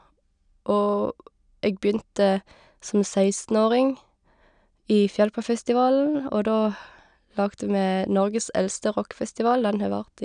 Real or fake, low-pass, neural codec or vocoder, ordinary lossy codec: real; 9.9 kHz; none; none